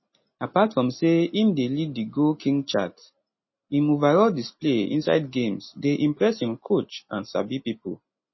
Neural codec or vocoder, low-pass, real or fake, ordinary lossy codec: none; 7.2 kHz; real; MP3, 24 kbps